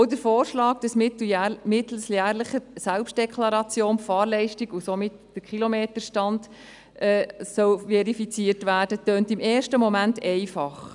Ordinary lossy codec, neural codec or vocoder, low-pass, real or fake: none; none; 10.8 kHz; real